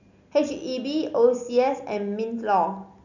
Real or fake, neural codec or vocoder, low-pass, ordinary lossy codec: real; none; 7.2 kHz; none